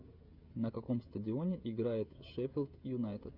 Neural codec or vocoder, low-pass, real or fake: codec, 16 kHz, 16 kbps, FreqCodec, smaller model; 5.4 kHz; fake